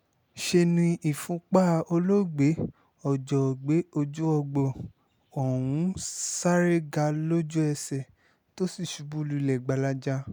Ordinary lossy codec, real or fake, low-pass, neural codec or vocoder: none; real; none; none